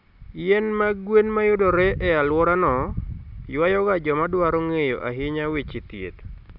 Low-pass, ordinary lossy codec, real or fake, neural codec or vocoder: 5.4 kHz; none; real; none